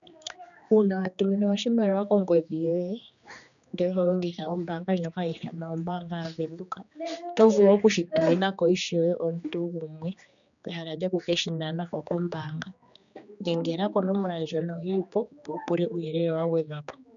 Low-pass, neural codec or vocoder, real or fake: 7.2 kHz; codec, 16 kHz, 2 kbps, X-Codec, HuBERT features, trained on general audio; fake